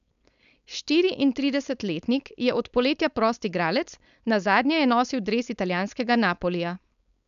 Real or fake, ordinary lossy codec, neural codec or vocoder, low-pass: fake; none; codec, 16 kHz, 4.8 kbps, FACodec; 7.2 kHz